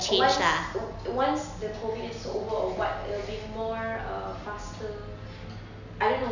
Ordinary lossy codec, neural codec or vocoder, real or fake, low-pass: none; none; real; 7.2 kHz